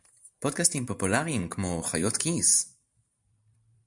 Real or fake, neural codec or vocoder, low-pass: fake; vocoder, 44.1 kHz, 128 mel bands every 512 samples, BigVGAN v2; 10.8 kHz